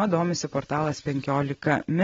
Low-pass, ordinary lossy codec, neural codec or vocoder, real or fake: 7.2 kHz; AAC, 24 kbps; none; real